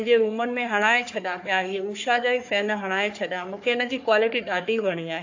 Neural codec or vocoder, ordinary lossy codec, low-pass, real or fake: codec, 44.1 kHz, 3.4 kbps, Pupu-Codec; AAC, 48 kbps; 7.2 kHz; fake